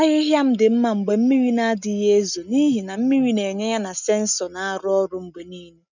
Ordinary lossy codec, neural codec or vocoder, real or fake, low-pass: none; none; real; 7.2 kHz